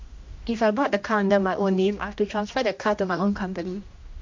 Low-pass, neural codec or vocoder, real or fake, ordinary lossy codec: 7.2 kHz; codec, 16 kHz, 1 kbps, X-Codec, HuBERT features, trained on general audio; fake; MP3, 48 kbps